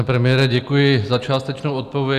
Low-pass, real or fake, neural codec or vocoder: 14.4 kHz; real; none